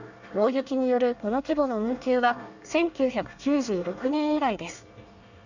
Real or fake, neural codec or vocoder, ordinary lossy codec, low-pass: fake; codec, 24 kHz, 1 kbps, SNAC; none; 7.2 kHz